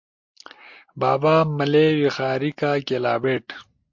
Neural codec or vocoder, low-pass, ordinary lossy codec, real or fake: none; 7.2 kHz; MP3, 48 kbps; real